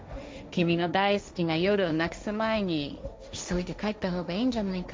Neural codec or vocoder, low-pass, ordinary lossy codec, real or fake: codec, 16 kHz, 1.1 kbps, Voila-Tokenizer; none; none; fake